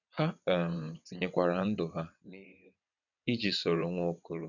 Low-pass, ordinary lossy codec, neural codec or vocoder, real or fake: 7.2 kHz; none; vocoder, 22.05 kHz, 80 mel bands, WaveNeXt; fake